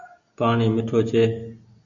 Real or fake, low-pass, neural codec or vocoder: real; 7.2 kHz; none